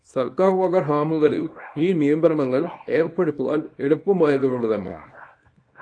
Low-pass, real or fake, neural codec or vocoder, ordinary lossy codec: 9.9 kHz; fake; codec, 24 kHz, 0.9 kbps, WavTokenizer, small release; AAC, 48 kbps